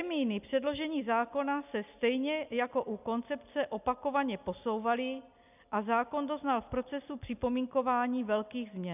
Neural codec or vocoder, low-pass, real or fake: none; 3.6 kHz; real